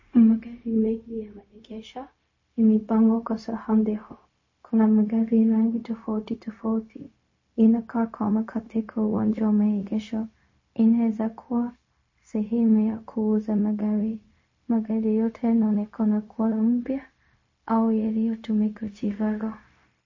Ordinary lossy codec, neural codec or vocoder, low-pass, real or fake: MP3, 32 kbps; codec, 16 kHz, 0.4 kbps, LongCat-Audio-Codec; 7.2 kHz; fake